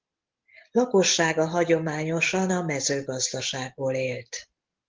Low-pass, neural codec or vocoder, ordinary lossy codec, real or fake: 7.2 kHz; none; Opus, 16 kbps; real